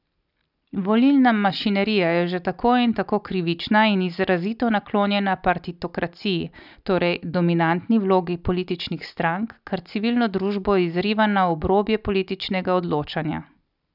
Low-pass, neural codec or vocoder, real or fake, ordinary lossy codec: 5.4 kHz; none; real; none